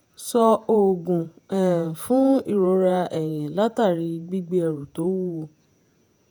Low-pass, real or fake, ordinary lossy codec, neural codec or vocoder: none; fake; none; vocoder, 48 kHz, 128 mel bands, Vocos